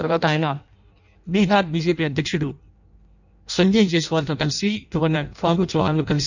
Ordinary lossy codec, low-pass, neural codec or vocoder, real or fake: none; 7.2 kHz; codec, 16 kHz in and 24 kHz out, 0.6 kbps, FireRedTTS-2 codec; fake